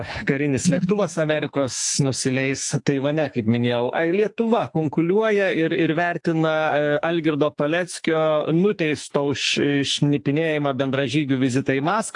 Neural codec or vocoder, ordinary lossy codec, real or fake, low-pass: codec, 44.1 kHz, 2.6 kbps, SNAC; AAC, 64 kbps; fake; 10.8 kHz